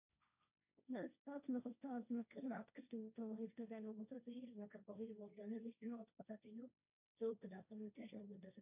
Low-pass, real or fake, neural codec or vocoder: 3.6 kHz; fake; codec, 16 kHz, 1.1 kbps, Voila-Tokenizer